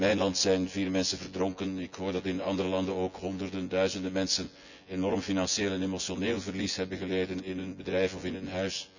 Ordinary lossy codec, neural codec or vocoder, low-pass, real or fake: none; vocoder, 24 kHz, 100 mel bands, Vocos; 7.2 kHz; fake